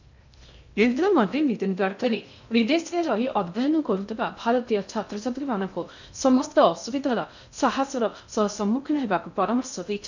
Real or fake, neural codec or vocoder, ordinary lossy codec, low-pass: fake; codec, 16 kHz in and 24 kHz out, 0.6 kbps, FocalCodec, streaming, 2048 codes; none; 7.2 kHz